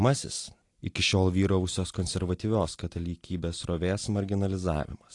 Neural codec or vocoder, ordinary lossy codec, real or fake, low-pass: none; AAC, 48 kbps; real; 10.8 kHz